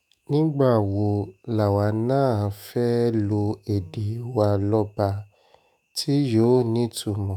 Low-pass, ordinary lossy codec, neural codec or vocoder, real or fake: none; none; autoencoder, 48 kHz, 128 numbers a frame, DAC-VAE, trained on Japanese speech; fake